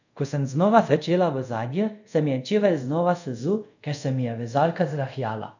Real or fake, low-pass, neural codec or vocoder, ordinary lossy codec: fake; 7.2 kHz; codec, 24 kHz, 0.5 kbps, DualCodec; none